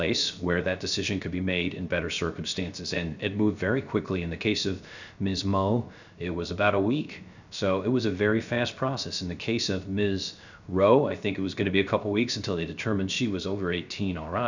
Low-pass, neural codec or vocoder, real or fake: 7.2 kHz; codec, 16 kHz, 0.3 kbps, FocalCodec; fake